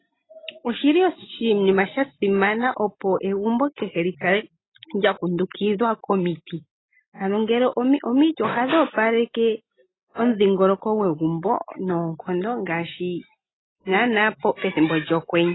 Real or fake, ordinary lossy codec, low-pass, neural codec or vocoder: real; AAC, 16 kbps; 7.2 kHz; none